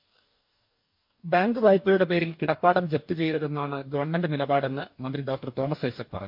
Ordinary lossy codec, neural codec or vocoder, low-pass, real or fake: MP3, 48 kbps; codec, 44.1 kHz, 2.6 kbps, DAC; 5.4 kHz; fake